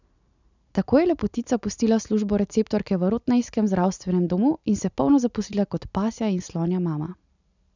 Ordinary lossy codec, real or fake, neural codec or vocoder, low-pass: none; real; none; 7.2 kHz